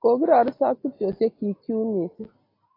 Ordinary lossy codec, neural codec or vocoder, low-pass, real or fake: AAC, 24 kbps; none; 5.4 kHz; real